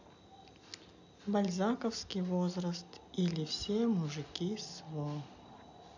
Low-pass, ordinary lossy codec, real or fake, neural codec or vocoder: 7.2 kHz; none; real; none